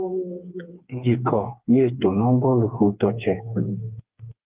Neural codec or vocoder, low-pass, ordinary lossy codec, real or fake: codec, 16 kHz, 4 kbps, FreqCodec, smaller model; 3.6 kHz; Opus, 32 kbps; fake